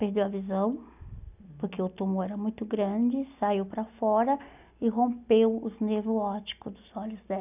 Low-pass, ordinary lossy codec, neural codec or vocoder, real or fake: 3.6 kHz; none; none; real